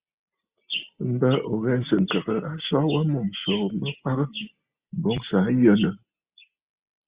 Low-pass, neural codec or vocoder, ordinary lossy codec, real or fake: 3.6 kHz; none; Opus, 64 kbps; real